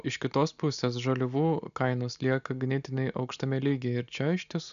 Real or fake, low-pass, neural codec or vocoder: real; 7.2 kHz; none